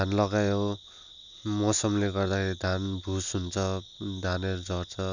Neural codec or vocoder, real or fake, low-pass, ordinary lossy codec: none; real; 7.2 kHz; none